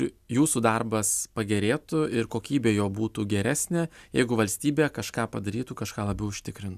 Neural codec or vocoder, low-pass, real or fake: none; 14.4 kHz; real